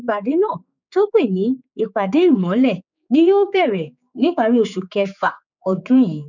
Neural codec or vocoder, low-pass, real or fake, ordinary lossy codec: codec, 16 kHz, 4 kbps, X-Codec, HuBERT features, trained on general audio; 7.2 kHz; fake; none